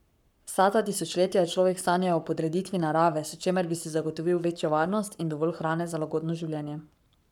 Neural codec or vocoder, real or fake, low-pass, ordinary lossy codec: codec, 44.1 kHz, 7.8 kbps, Pupu-Codec; fake; 19.8 kHz; none